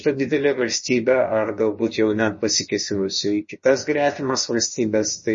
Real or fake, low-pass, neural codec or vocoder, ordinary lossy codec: fake; 7.2 kHz; codec, 16 kHz, about 1 kbps, DyCAST, with the encoder's durations; MP3, 32 kbps